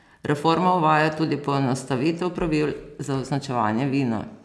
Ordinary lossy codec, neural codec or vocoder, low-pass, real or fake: none; none; none; real